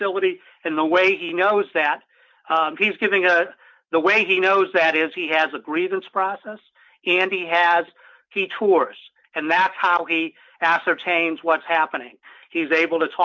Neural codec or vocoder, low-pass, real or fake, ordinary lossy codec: none; 7.2 kHz; real; MP3, 64 kbps